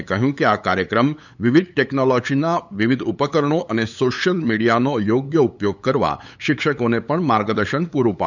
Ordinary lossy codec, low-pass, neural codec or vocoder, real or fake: none; 7.2 kHz; codec, 16 kHz, 8 kbps, FunCodec, trained on LibriTTS, 25 frames a second; fake